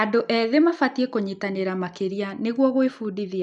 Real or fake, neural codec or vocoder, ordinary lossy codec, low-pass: real; none; none; 10.8 kHz